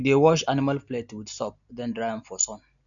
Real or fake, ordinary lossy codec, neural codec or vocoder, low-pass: real; none; none; 7.2 kHz